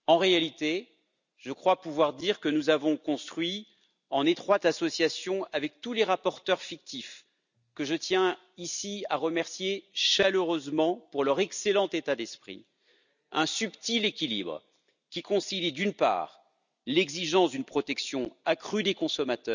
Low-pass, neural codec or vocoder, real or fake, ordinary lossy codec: 7.2 kHz; none; real; none